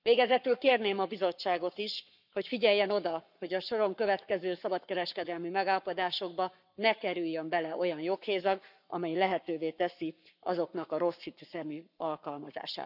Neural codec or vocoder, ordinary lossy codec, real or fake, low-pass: codec, 44.1 kHz, 7.8 kbps, Pupu-Codec; none; fake; 5.4 kHz